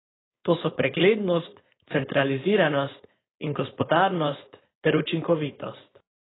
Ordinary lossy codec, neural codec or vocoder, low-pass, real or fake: AAC, 16 kbps; codec, 16 kHz, 4.8 kbps, FACodec; 7.2 kHz; fake